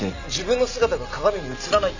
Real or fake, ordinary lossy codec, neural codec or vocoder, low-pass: real; none; none; 7.2 kHz